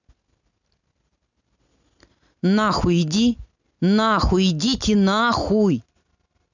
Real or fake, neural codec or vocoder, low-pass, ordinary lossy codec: real; none; 7.2 kHz; none